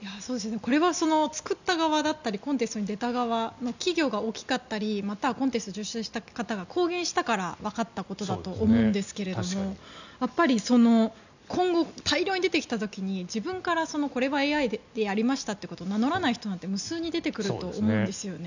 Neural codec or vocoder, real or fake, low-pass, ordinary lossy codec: none; real; 7.2 kHz; none